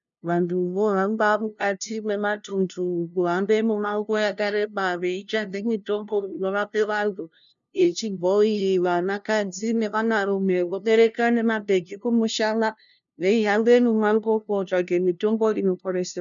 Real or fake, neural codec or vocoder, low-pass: fake; codec, 16 kHz, 0.5 kbps, FunCodec, trained on LibriTTS, 25 frames a second; 7.2 kHz